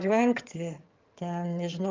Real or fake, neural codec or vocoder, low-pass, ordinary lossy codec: fake; vocoder, 22.05 kHz, 80 mel bands, HiFi-GAN; 7.2 kHz; Opus, 24 kbps